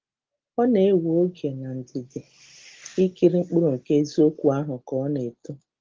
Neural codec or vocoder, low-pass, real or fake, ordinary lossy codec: none; 7.2 kHz; real; Opus, 16 kbps